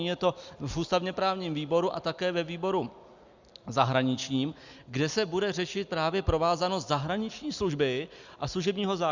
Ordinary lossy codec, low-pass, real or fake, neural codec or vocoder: Opus, 64 kbps; 7.2 kHz; real; none